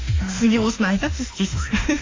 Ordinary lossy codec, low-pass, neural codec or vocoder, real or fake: AAC, 32 kbps; 7.2 kHz; autoencoder, 48 kHz, 32 numbers a frame, DAC-VAE, trained on Japanese speech; fake